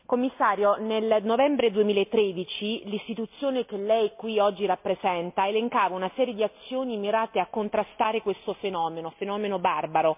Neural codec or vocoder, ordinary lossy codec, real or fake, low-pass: none; MP3, 32 kbps; real; 3.6 kHz